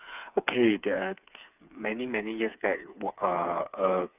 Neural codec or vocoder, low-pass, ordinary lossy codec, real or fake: codec, 16 kHz, 4 kbps, FreqCodec, smaller model; 3.6 kHz; none; fake